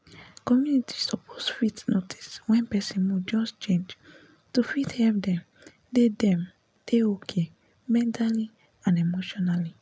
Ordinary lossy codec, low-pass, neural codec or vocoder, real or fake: none; none; none; real